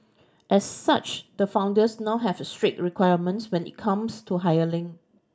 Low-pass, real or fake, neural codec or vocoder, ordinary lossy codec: none; real; none; none